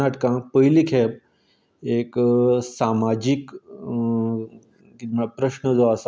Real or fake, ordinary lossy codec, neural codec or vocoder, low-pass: real; none; none; none